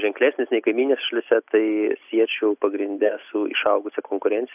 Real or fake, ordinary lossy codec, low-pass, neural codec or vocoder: real; AAC, 32 kbps; 3.6 kHz; none